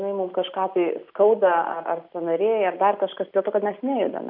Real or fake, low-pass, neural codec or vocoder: real; 5.4 kHz; none